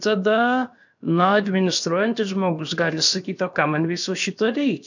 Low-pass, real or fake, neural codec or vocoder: 7.2 kHz; fake; codec, 16 kHz, about 1 kbps, DyCAST, with the encoder's durations